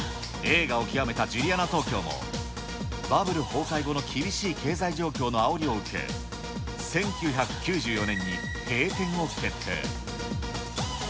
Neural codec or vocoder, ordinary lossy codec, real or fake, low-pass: none; none; real; none